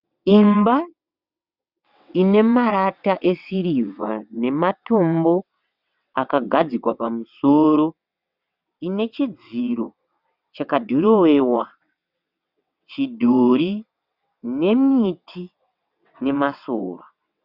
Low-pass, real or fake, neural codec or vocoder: 5.4 kHz; fake; vocoder, 22.05 kHz, 80 mel bands, WaveNeXt